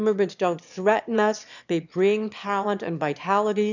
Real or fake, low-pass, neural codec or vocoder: fake; 7.2 kHz; autoencoder, 22.05 kHz, a latent of 192 numbers a frame, VITS, trained on one speaker